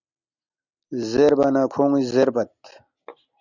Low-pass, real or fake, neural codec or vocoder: 7.2 kHz; real; none